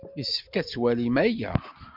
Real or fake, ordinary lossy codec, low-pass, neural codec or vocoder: real; MP3, 48 kbps; 5.4 kHz; none